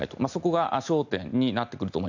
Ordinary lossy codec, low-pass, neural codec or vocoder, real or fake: none; 7.2 kHz; none; real